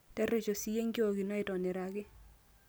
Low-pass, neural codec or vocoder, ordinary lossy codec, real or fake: none; none; none; real